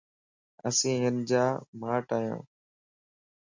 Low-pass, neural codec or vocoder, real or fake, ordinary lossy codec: 7.2 kHz; none; real; MP3, 48 kbps